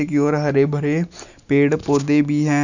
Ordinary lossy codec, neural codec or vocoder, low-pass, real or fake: none; none; 7.2 kHz; real